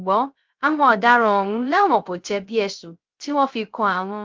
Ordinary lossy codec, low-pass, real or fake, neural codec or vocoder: Opus, 32 kbps; 7.2 kHz; fake; codec, 16 kHz, 0.3 kbps, FocalCodec